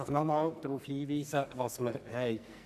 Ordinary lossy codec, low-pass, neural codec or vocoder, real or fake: none; 14.4 kHz; codec, 44.1 kHz, 2.6 kbps, SNAC; fake